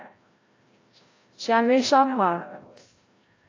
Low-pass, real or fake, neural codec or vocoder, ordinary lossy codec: 7.2 kHz; fake; codec, 16 kHz, 0.5 kbps, FreqCodec, larger model; AAC, 32 kbps